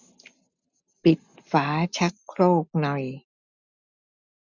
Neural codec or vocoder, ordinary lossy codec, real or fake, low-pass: none; none; real; 7.2 kHz